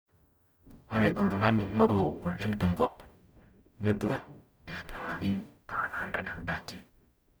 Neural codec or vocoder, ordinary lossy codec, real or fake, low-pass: codec, 44.1 kHz, 0.9 kbps, DAC; none; fake; none